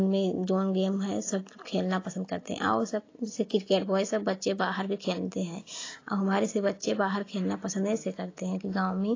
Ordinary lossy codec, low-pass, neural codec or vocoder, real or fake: AAC, 32 kbps; 7.2 kHz; none; real